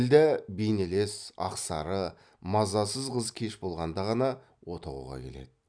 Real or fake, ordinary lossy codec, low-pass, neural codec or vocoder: real; none; 9.9 kHz; none